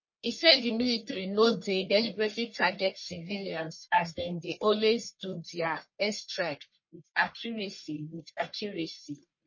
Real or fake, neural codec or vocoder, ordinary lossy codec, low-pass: fake; codec, 44.1 kHz, 1.7 kbps, Pupu-Codec; MP3, 32 kbps; 7.2 kHz